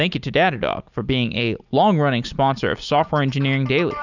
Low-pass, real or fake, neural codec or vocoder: 7.2 kHz; real; none